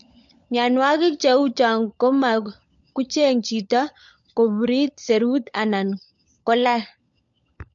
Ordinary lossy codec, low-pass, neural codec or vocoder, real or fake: MP3, 48 kbps; 7.2 kHz; codec, 16 kHz, 16 kbps, FunCodec, trained on LibriTTS, 50 frames a second; fake